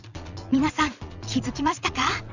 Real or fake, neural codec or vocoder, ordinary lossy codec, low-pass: fake; vocoder, 22.05 kHz, 80 mel bands, Vocos; none; 7.2 kHz